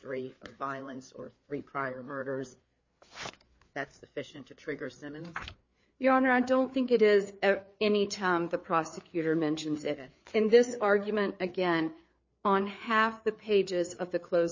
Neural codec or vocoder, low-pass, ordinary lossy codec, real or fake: codec, 16 kHz, 4 kbps, FreqCodec, larger model; 7.2 kHz; MP3, 32 kbps; fake